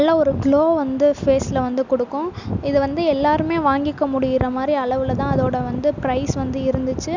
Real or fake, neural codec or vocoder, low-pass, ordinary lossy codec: real; none; 7.2 kHz; none